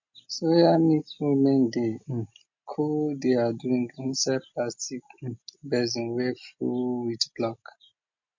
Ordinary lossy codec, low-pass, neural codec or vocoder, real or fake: MP3, 48 kbps; 7.2 kHz; none; real